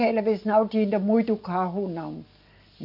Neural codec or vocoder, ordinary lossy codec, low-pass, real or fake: none; none; 5.4 kHz; real